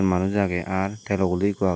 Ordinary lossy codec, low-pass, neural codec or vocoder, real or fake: none; none; none; real